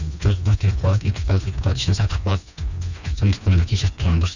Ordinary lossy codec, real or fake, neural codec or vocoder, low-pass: none; fake; codec, 16 kHz, 2 kbps, FreqCodec, smaller model; 7.2 kHz